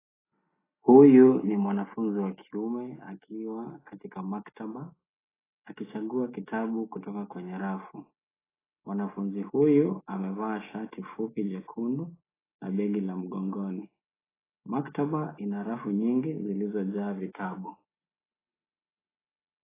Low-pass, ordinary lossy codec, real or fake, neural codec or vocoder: 3.6 kHz; AAC, 16 kbps; real; none